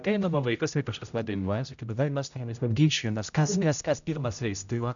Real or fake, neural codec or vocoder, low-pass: fake; codec, 16 kHz, 0.5 kbps, X-Codec, HuBERT features, trained on general audio; 7.2 kHz